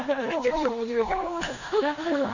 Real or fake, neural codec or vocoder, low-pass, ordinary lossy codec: fake; codec, 16 kHz in and 24 kHz out, 0.9 kbps, LongCat-Audio-Codec, four codebook decoder; 7.2 kHz; none